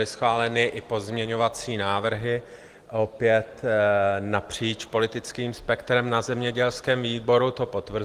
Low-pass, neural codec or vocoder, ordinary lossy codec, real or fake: 14.4 kHz; none; Opus, 24 kbps; real